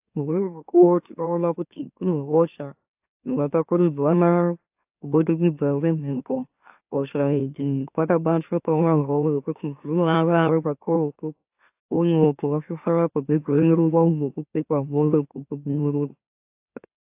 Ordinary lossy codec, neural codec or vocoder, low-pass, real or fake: AAC, 32 kbps; autoencoder, 44.1 kHz, a latent of 192 numbers a frame, MeloTTS; 3.6 kHz; fake